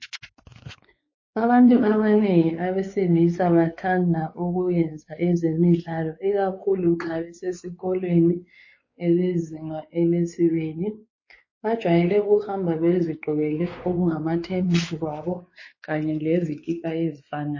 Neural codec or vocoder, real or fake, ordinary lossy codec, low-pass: codec, 16 kHz, 4 kbps, X-Codec, WavLM features, trained on Multilingual LibriSpeech; fake; MP3, 32 kbps; 7.2 kHz